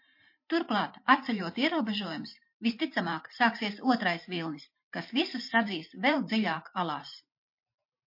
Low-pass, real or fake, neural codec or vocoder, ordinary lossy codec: 5.4 kHz; fake; vocoder, 44.1 kHz, 128 mel bands every 512 samples, BigVGAN v2; MP3, 32 kbps